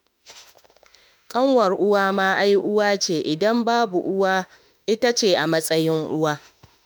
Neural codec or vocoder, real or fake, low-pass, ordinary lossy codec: autoencoder, 48 kHz, 32 numbers a frame, DAC-VAE, trained on Japanese speech; fake; none; none